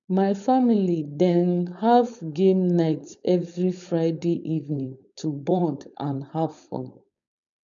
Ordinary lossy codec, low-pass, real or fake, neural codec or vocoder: none; 7.2 kHz; fake; codec, 16 kHz, 4.8 kbps, FACodec